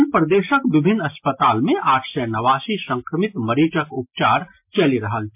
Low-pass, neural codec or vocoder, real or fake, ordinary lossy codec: 3.6 kHz; none; real; MP3, 32 kbps